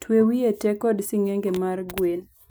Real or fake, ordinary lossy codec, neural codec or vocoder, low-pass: real; none; none; none